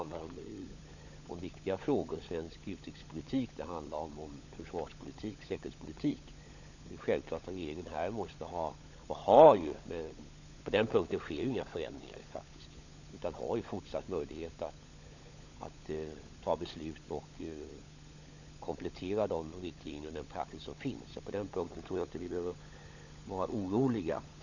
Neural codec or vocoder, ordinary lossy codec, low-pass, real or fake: codec, 16 kHz, 16 kbps, FunCodec, trained on LibriTTS, 50 frames a second; none; 7.2 kHz; fake